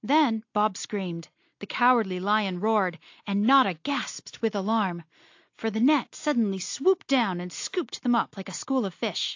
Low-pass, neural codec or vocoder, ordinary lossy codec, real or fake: 7.2 kHz; none; AAC, 48 kbps; real